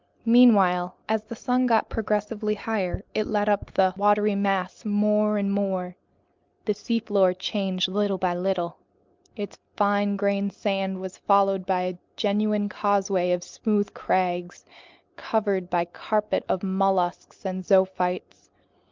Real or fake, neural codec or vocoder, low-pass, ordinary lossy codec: real; none; 7.2 kHz; Opus, 24 kbps